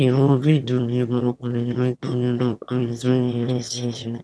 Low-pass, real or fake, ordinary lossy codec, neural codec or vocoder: none; fake; none; autoencoder, 22.05 kHz, a latent of 192 numbers a frame, VITS, trained on one speaker